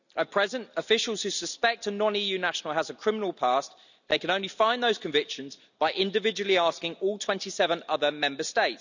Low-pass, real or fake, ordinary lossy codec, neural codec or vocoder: 7.2 kHz; real; none; none